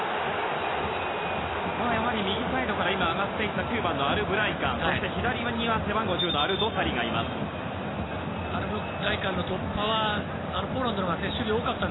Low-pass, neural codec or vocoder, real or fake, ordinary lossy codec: 7.2 kHz; none; real; AAC, 16 kbps